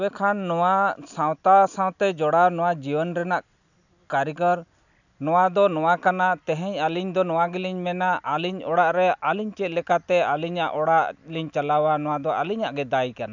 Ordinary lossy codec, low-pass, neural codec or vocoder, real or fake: none; 7.2 kHz; none; real